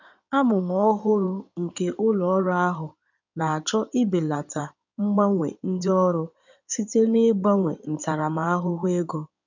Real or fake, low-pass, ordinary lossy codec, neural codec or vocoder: fake; 7.2 kHz; none; codec, 16 kHz in and 24 kHz out, 2.2 kbps, FireRedTTS-2 codec